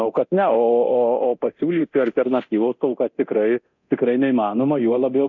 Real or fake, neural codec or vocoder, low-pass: fake; codec, 24 kHz, 0.9 kbps, DualCodec; 7.2 kHz